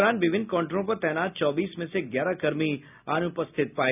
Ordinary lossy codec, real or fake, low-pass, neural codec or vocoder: none; real; 3.6 kHz; none